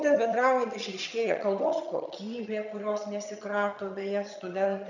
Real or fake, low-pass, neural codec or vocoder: fake; 7.2 kHz; vocoder, 22.05 kHz, 80 mel bands, HiFi-GAN